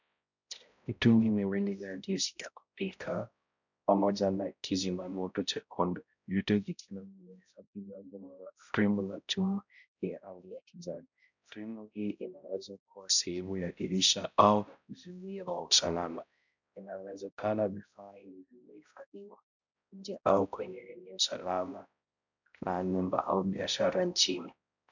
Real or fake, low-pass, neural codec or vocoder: fake; 7.2 kHz; codec, 16 kHz, 0.5 kbps, X-Codec, HuBERT features, trained on balanced general audio